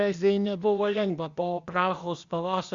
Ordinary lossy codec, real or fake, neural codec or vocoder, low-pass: Opus, 64 kbps; fake; codec, 16 kHz, 0.8 kbps, ZipCodec; 7.2 kHz